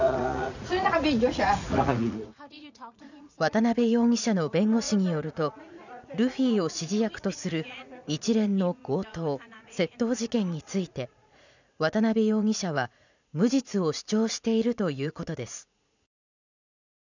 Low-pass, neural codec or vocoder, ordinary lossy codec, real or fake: 7.2 kHz; vocoder, 44.1 kHz, 80 mel bands, Vocos; none; fake